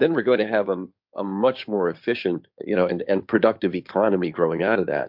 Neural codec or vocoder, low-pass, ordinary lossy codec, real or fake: codec, 16 kHz in and 24 kHz out, 2.2 kbps, FireRedTTS-2 codec; 5.4 kHz; MP3, 48 kbps; fake